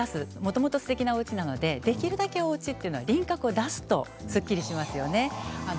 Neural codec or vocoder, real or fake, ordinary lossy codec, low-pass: none; real; none; none